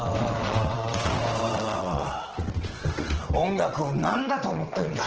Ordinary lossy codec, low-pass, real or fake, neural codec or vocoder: Opus, 16 kbps; 7.2 kHz; fake; vocoder, 22.05 kHz, 80 mel bands, WaveNeXt